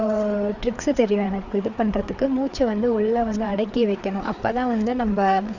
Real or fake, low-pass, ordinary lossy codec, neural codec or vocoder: fake; 7.2 kHz; none; codec, 16 kHz, 4 kbps, FreqCodec, larger model